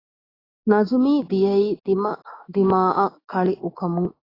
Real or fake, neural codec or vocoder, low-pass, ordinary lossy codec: fake; autoencoder, 48 kHz, 128 numbers a frame, DAC-VAE, trained on Japanese speech; 5.4 kHz; AAC, 24 kbps